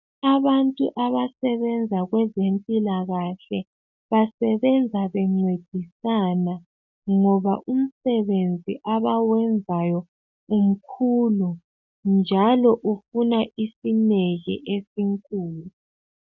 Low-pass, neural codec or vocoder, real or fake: 7.2 kHz; none; real